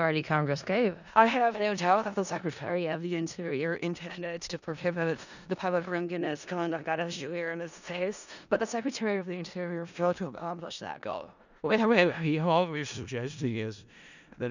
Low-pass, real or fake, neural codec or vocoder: 7.2 kHz; fake; codec, 16 kHz in and 24 kHz out, 0.4 kbps, LongCat-Audio-Codec, four codebook decoder